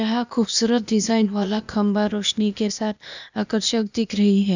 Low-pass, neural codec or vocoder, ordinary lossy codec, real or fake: 7.2 kHz; codec, 16 kHz, 0.8 kbps, ZipCodec; none; fake